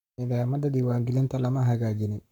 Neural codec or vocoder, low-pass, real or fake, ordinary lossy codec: codec, 44.1 kHz, 7.8 kbps, Pupu-Codec; 19.8 kHz; fake; none